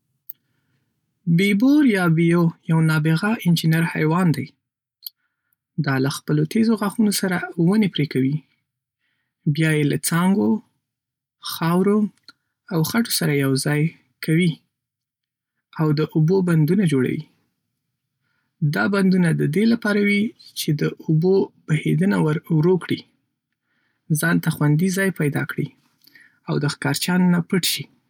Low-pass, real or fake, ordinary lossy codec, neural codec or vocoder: 19.8 kHz; real; none; none